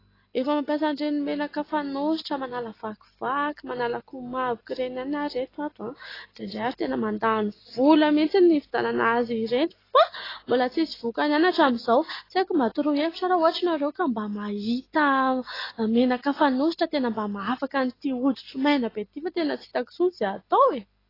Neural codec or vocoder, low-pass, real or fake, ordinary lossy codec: none; 5.4 kHz; real; AAC, 24 kbps